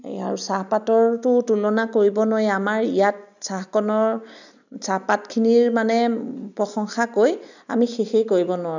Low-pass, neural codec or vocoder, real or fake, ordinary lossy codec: 7.2 kHz; none; real; none